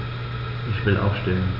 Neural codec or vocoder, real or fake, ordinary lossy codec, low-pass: none; real; none; 5.4 kHz